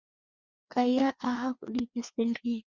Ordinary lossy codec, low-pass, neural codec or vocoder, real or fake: Opus, 64 kbps; 7.2 kHz; codec, 16 kHz, 2 kbps, FreqCodec, larger model; fake